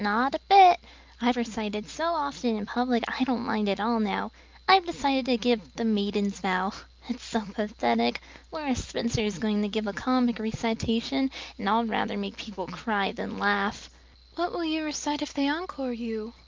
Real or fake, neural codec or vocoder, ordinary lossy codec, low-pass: real; none; Opus, 24 kbps; 7.2 kHz